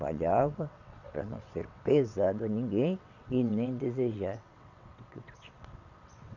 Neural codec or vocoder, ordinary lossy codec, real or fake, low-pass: vocoder, 22.05 kHz, 80 mel bands, WaveNeXt; none; fake; 7.2 kHz